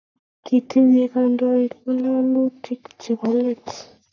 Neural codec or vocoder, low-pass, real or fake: codec, 44.1 kHz, 3.4 kbps, Pupu-Codec; 7.2 kHz; fake